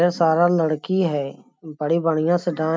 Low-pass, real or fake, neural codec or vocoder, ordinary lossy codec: none; real; none; none